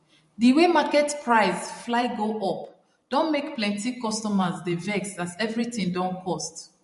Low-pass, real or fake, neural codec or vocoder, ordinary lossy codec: 14.4 kHz; fake; vocoder, 44.1 kHz, 128 mel bands every 512 samples, BigVGAN v2; MP3, 48 kbps